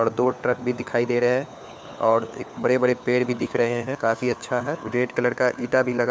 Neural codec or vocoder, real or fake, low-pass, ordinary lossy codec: codec, 16 kHz, 4 kbps, FunCodec, trained on LibriTTS, 50 frames a second; fake; none; none